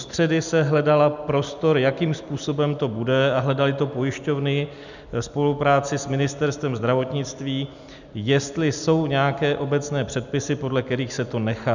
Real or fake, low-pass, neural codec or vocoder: real; 7.2 kHz; none